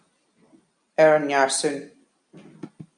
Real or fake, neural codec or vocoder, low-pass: real; none; 9.9 kHz